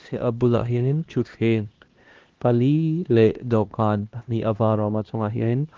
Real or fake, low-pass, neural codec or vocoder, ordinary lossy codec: fake; 7.2 kHz; codec, 16 kHz, 1 kbps, X-Codec, HuBERT features, trained on LibriSpeech; Opus, 16 kbps